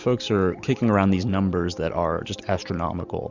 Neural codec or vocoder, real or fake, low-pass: none; real; 7.2 kHz